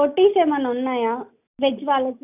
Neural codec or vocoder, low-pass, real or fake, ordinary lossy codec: none; 3.6 kHz; real; none